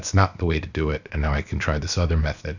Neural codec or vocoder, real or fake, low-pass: codec, 16 kHz, about 1 kbps, DyCAST, with the encoder's durations; fake; 7.2 kHz